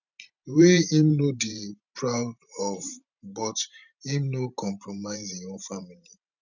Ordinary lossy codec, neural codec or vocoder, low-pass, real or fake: none; vocoder, 44.1 kHz, 128 mel bands every 512 samples, BigVGAN v2; 7.2 kHz; fake